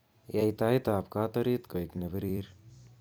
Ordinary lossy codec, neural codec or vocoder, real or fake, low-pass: none; vocoder, 44.1 kHz, 128 mel bands every 256 samples, BigVGAN v2; fake; none